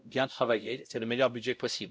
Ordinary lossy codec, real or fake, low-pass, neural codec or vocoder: none; fake; none; codec, 16 kHz, 0.5 kbps, X-Codec, WavLM features, trained on Multilingual LibriSpeech